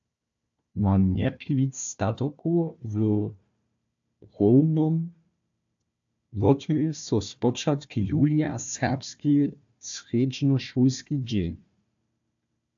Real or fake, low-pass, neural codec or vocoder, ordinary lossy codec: fake; 7.2 kHz; codec, 16 kHz, 1 kbps, FunCodec, trained on Chinese and English, 50 frames a second; AAC, 64 kbps